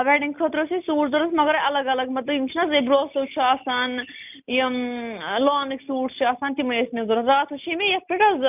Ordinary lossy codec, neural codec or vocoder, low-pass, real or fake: none; none; 3.6 kHz; real